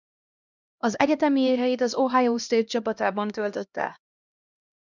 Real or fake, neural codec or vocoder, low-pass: fake; codec, 16 kHz, 1 kbps, X-Codec, HuBERT features, trained on LibriSpeech; 7.2 kHz